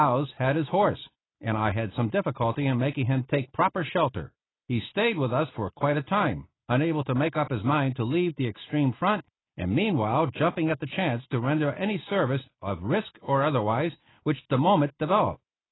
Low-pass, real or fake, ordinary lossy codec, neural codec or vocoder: 7.2 kHz; real; AAC, 16 kbps; none